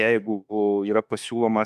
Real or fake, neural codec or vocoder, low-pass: fake; autoencoder, 48 kHz, 32 numbers a frame, DAC-VAE, trained on Japanese speech; 14.4 kHz